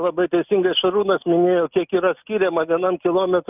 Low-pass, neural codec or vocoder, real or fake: 3.6 kHz; none; real